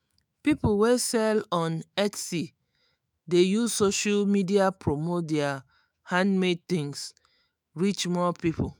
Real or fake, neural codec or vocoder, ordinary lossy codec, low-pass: fake; autoencoder, 48 kHz, 128 numbers a frame, DAC-VAE, trained on Japanese speech; none; none